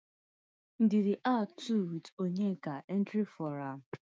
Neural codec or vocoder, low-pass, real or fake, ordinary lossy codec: codec, 16 kHz, 6 kbps, DAC; none; fake; none